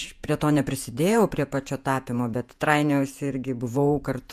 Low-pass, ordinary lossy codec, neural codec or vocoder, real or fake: 14.4 kHz; MP3, 96 kbps; vocoder, 48 kHz, 128 mel bands, Vocos; fake